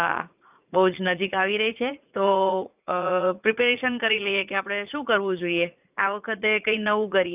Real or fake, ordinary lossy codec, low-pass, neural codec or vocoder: fake; none; 3.6 kHz; vocoder, 44.1 kHz, 80 mel bands, Vocos